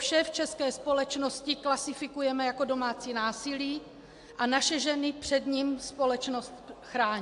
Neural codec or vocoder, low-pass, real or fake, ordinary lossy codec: none; 10.8 kHz; real; AAC, 64 kbps